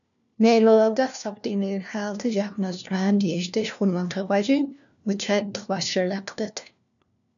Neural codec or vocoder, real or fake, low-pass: codec, 16 kHz, 1 kbps, FunCodec, trained on LibriTTS, 50 frames a second; fake; 7.2 kHz